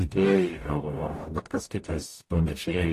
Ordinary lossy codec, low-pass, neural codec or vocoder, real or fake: AAC, 48 kbps; 14.4 kHz; codec, 44.1 kHz, 0.9 kbps, DAC; fake